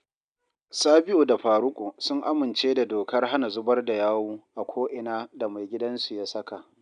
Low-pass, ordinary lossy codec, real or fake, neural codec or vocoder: 9.9 kHz; none; real; none